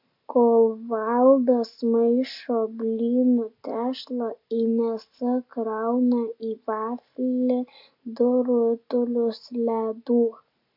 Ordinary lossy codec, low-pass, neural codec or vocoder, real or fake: AAC, 48 kbps; 5.4 kHz; none; real